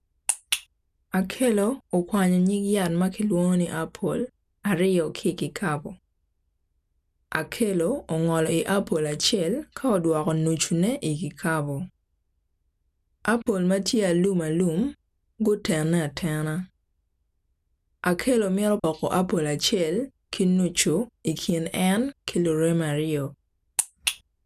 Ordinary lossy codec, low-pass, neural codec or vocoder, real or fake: none; 14.4 kHz; none; real